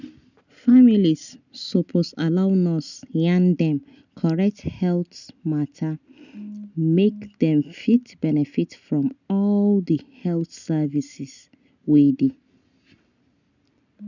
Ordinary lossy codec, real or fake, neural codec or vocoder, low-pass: none; real; none; 7.2 kHz